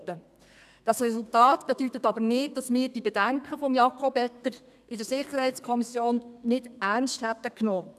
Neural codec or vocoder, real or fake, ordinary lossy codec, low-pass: codec, 32 kHz, 1.9 kbps, SNAC; fake; none; 14.4 kHz